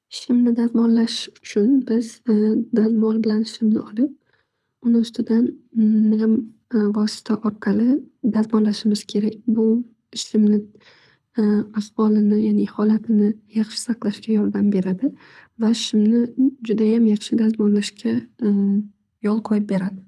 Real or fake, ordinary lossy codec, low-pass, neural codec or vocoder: fake; none; none; codec, 24 kHz, 6 kbps, HILCodec